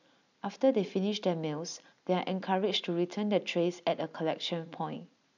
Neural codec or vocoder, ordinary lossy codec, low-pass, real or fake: none; none; 7.2 kHz; real